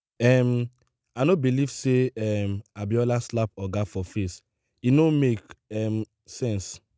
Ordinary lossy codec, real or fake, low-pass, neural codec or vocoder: none; real; none; none